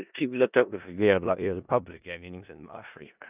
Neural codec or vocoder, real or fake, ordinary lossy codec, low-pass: codec, 16 kHz in and 24 kHz out, 0.4 kbps, LongCat-Audio-Codec, four codebook decoder; fake; none; 3.6 kHz